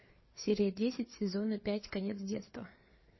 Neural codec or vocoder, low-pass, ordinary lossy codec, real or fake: codec, 16 kHz, 4 kbps, FreqCodec, larger model; 7.2 kHz; MP3, 24 kbps; fake